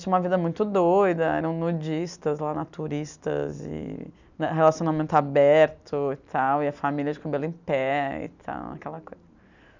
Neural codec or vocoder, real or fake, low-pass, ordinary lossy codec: none; real; 7.2 kHz; none